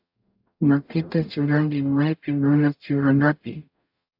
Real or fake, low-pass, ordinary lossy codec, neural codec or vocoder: fake; 5.4 kHz; AAC, 48 kbps; codec, 44.1 kHz, 0.9 kbps, DAC